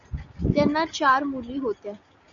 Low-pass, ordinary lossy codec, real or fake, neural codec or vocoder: 7.2 kHz; MP3, 96 kbps; real; none